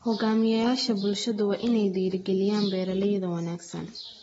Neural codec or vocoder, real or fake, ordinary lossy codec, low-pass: none; real; AAC, 24 kbps; 19.8 kHz